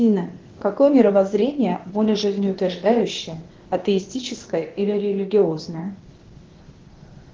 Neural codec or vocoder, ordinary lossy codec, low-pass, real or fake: codec, 16 kHz, 0.8 kbps, ZipCodec; Opus, 16 kbps; 7.2 kHz; fake